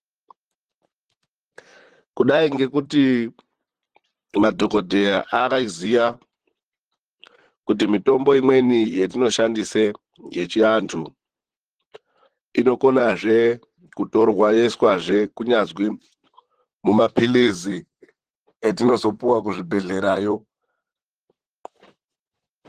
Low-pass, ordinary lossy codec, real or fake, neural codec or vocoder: 14.4 kHz; Opus, 16 kbps; fake; vocoder, 44.1 kHz, 128 mel bands, Pupu-Vocoder